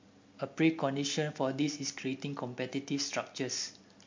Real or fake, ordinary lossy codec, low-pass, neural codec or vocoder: real; MP3, 48 kbps; 7.2 kHz; none